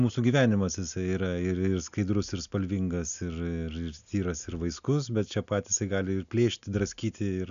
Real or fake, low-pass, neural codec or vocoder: real; 7.2 kHz; none